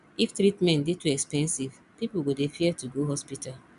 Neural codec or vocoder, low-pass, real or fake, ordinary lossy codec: none; 10.8 kHz; real; none